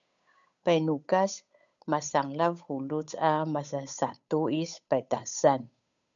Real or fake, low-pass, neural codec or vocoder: fake; 7.2 kHz; codec, 16 kHz, 8 kbps, FunCodec, trained on Chinese and English, 25 frames a second